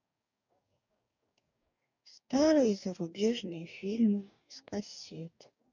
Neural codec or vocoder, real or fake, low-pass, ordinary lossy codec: codec, 44.1 kHz, 2.6 kbps, DAC; fake; 7.2 kHz; none